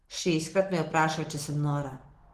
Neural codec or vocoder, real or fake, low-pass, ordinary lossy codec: none; real; 14.4 kHz; Opus, 16 kbps